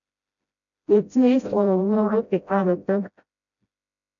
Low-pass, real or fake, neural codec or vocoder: 7.2 kHz; fake; codec, 16 kHz, 0.5 kbps, FreqCodec, smaller model